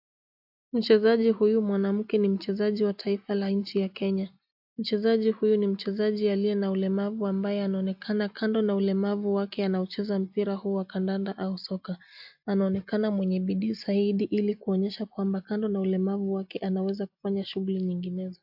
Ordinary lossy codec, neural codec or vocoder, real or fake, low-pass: AAC, 48 kbps; none; real; 5.4 kHz